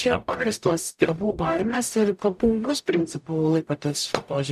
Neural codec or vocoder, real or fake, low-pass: codec, 44.1 kHz, 0.9 kbps, DAC; fake; 14.4 kHz